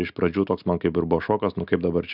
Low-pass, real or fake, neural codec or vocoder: 5.4 kHz; real; none